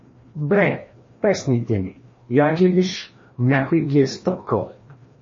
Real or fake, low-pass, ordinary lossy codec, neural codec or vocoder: fake; 7.2 kHz; MP3, 32 kbps; codec, 16 kHz, 1 kbps, FreqCodec, larger model